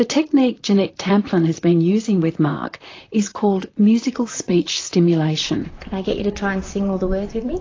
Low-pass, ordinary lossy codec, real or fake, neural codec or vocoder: 7.2 kHz; AAC, 32 kbps; fake; vocoder, 44.1 kHz, 128 mel bands, Pupu-Vocoder